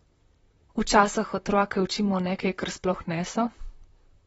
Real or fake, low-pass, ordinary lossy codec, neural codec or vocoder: fake; 19.8 kHz; AAC, 24 kbps; vocoder, 44.1 kHz, 128 mel bands, Pupu-Vocoder